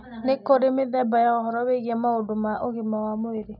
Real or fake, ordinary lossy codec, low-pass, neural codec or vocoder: real; none; 5.4 kHz; none